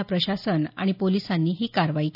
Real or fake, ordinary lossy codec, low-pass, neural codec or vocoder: real; none; 5.4 kHz; none